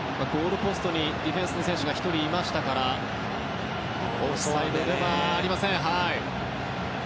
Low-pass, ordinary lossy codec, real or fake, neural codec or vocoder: none; none; real; none